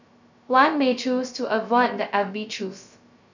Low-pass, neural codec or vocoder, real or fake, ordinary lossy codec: 7.2 kHz; codec, 16 kHz, 0.2 kbps, FocalCodec; fake; none